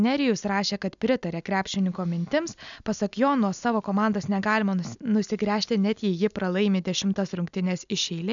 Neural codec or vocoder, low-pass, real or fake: none; 7.2 kHz; real